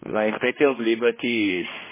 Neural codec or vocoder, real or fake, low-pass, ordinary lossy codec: codec, 16 kHz, 1 kbps, X-Codec, HuBERT features, trained on general audio; fake; 3.6 kHz; MP3, 16 kbps